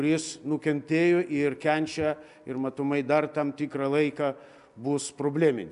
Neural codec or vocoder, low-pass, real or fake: none; 10.8 kHz; real